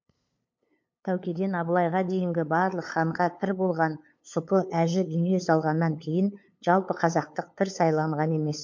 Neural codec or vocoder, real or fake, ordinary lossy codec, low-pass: codec, 16 kHz, 8 kbps, FunCodec, trained on LibriTTS, 25 frames a second; fake; MP3, 48 kbps; 7.2 kHz